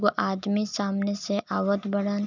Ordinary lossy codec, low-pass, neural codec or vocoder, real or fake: none; 7.2 kHz; none; real